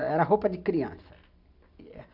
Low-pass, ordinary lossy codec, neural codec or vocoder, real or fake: 5.4 kHz; AAC, 48 kbps; none; real